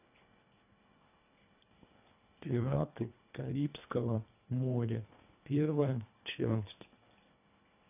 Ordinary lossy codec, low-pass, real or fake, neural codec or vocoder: none; 3.6 kHz; fake; codec, 24 kHz, 1.5 kbps, HILCodec